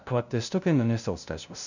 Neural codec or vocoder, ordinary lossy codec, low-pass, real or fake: codec, 16 kHz, 0.5 kbps, FunCodec, trained on LibriTTS, 25 frames a second; none; 7.2 kHz; fake